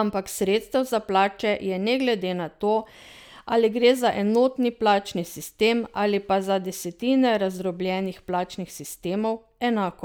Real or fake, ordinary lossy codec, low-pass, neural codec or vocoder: real; none; none; none